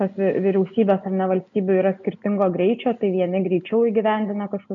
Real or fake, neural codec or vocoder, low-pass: real; none; 7.2 kHz